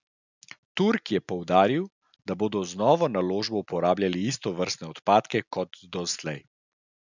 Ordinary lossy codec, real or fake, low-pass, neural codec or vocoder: none; real; 7.2 kHz; none